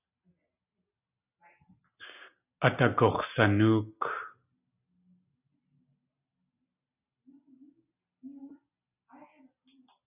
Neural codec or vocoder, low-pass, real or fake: none; 3.6 kHz; real